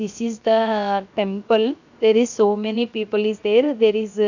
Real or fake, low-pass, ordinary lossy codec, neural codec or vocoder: fake; 7.2 kHz; none; codec, 16 kHz, 0.7 kbps, FocalCodec